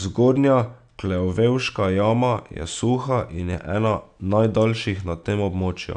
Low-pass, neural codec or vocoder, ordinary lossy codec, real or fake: 9.9 kHz; none; AAC, 96 kbps; real